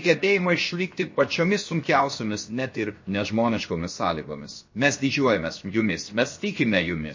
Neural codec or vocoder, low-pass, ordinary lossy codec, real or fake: codec, 16 kHz, 0.7 kbps, FocalCodec; 7.2 kHz; MP3, 32 kbps; fake